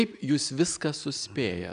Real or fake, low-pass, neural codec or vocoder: real; 9.9 kHz; none